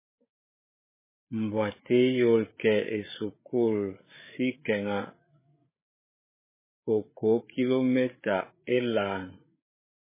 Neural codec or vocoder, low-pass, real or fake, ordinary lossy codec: codec, 16 kHz, 8 kbps, FreqCodec, larger model; 3.6 kHz; fake; MP3, 16 kbps